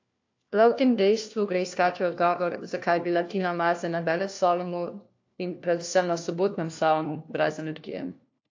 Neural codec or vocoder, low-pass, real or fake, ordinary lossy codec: codec, 16 kHz, 1 kbps, FunCodec, trained on LibriTTS, 50 frames a second; 7.2 kHz; fake; AAC, 48 kbps